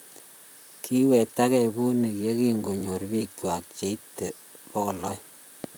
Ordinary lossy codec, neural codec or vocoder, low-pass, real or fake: none; vocoder, 44.1 kHz, 128 mel bands, Pupu-Vocoder; none; fake